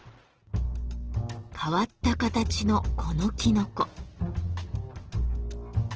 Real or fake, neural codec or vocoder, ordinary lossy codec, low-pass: real; none; Opus, 16 kbps; 7.2 kHz